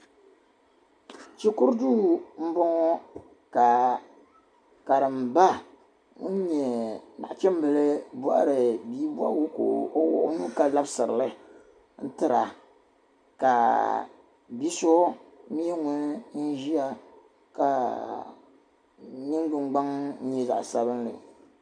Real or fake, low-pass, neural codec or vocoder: real; 9.9 kHz; none